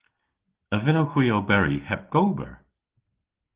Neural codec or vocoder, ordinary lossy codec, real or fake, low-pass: none; Opus, 16 kbps; real; 3.6 kHz